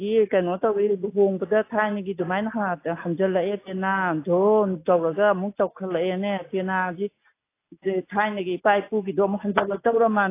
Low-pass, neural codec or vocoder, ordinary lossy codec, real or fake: 3.6 kHz; none; AAC, 24 kbps; real